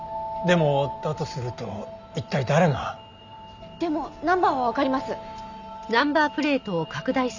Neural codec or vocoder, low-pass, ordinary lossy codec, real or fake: none; 7.2 kHz; Opus, 64 kbps; real